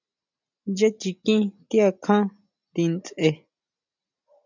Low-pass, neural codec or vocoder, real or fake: 7.2 kHz; none; real